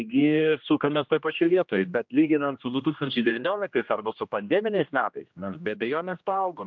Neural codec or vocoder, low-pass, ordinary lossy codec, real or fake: codec, 16 kHz, 1 kbps, X-Codec, HuBERT features, trained on general audio; 7.2 kHz; MP3, 64 kbps; fake